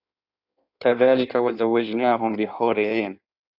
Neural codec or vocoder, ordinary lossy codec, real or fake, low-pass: codec, 16 kHz in and 24 kHz out, 1.1 kbps, FireRedTTS-2 codec; AAC, 48 kbps; fake; 5.4 kHz